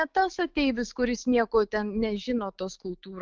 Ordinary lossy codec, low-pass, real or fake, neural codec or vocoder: Opus, 32 kbps; 7.2 kHz; fake; autoencoder, 48 kHz, 128 numbers a frame, DAC-VAE, trained on Japanese speech